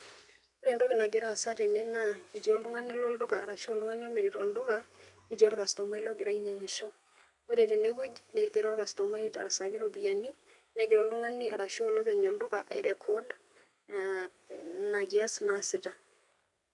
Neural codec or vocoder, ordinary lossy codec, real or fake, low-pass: codec, 32 kHz, 1.9 kbps, SNAC; none; fake; 10.8 kHz